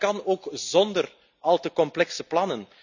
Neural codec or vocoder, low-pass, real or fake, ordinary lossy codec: none; 7.2 kHz; real; none